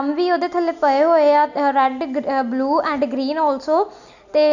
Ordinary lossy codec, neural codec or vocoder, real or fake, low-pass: none; none; real; 7.2 kHz